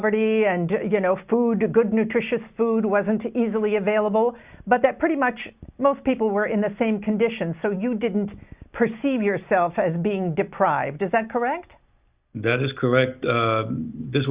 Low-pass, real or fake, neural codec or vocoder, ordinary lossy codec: 3.6 kHz; real; none; Opus, 64 kbps